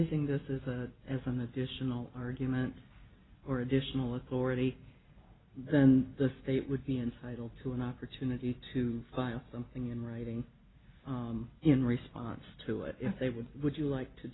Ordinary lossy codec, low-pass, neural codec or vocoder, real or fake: AAC, 16 kbps; 7.2 kHz; none; real